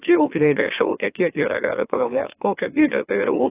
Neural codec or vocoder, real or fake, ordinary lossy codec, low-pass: autoencoder, 44.1 kHz, a latent of 192 numbers a frame, MeloTTS; fake; AAC, 16 kbps; 3.6 kHz